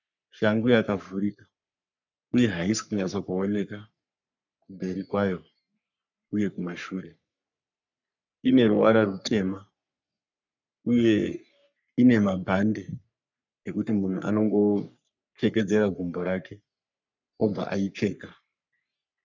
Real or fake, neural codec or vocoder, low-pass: fake; codec, 44.1 kHz, 3.4 kbps, Pupu-Codec; 7.2 kHz